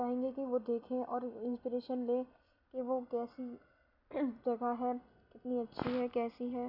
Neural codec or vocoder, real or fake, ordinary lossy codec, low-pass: none; real; none; 5.4 kHz